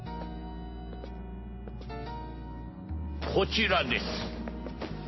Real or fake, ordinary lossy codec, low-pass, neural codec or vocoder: real; MP3, 24 kbps; 7.2 kHz; none